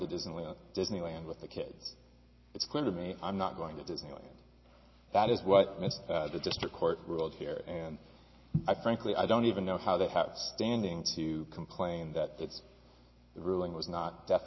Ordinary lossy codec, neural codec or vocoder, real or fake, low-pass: MP3, 24 kbps; none; real; 7.2 kHz